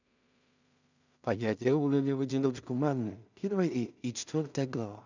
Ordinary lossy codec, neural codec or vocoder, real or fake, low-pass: none; codec, 16 kHz in and 24 kHz out, 0.4 kbps, LongCat-Audio-Codec, two codebook decoder; fake; 7.2 kHz